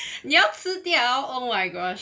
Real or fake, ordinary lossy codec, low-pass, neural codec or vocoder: real; none; none; none